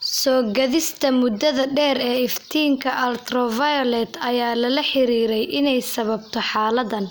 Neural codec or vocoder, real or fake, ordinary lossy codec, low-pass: none; real; none; none